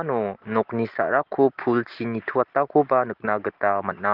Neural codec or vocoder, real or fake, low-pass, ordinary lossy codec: none; real; 5.4 kHz; Opus, 16 kbps